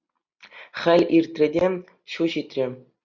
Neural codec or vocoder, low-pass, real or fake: none; 7.2 kHz; real